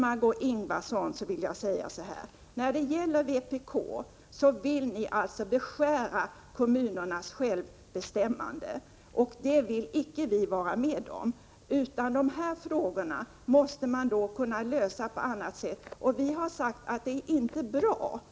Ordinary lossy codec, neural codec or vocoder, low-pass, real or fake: none; none; none; real